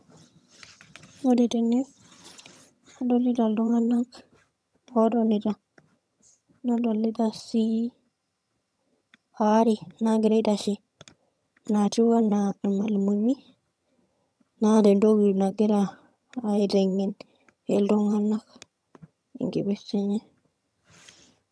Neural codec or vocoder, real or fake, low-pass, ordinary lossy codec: vocoder, 22.05 kHz, 80 mel bands, HiFi-GAN; fake; none; none